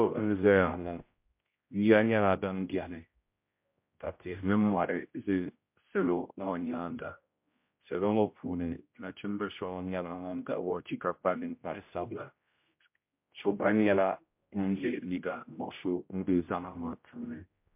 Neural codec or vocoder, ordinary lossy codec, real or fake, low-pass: codec, 16 kHz, 0.5 kbps, X-Codec, HuBERT features, trained on general audio; MP3, 32 kbps; fake; 3.6 kHz